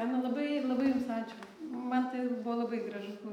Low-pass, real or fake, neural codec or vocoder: 19.8 kHz; real; none